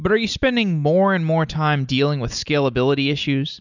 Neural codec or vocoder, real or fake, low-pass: none; real; 7.2 kHz